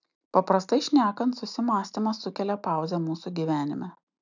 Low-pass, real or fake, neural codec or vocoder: 7.2 kHz; real; none